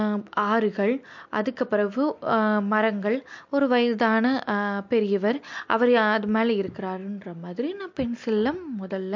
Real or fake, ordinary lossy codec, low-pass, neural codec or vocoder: real; MP3, 48 kbps; 7.2 kHz; none